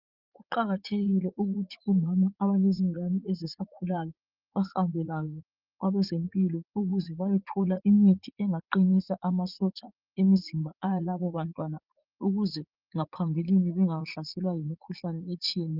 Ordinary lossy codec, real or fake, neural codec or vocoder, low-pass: Opus, 32 kbps; fake; vocoder, 44.1 kHz, 80 mel bands, Vocos; 5.4 kHz